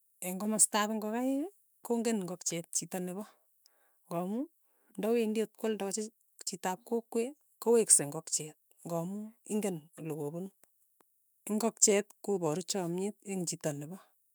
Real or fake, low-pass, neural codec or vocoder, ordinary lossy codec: fake; none; autoencoder, 48 kHz, 128 numbers a frame, DAC-VAE, trained on Japanese speech; none